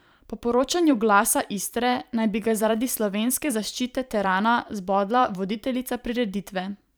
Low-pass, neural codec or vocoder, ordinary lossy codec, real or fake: none; vocoder, 44.1 kHz, 128 mel bands every 512 samples, BigVGAN v2; none; fake